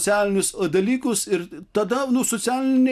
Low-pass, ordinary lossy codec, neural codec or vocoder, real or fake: 14.4 kHz; AAC, 96 kbps; none; real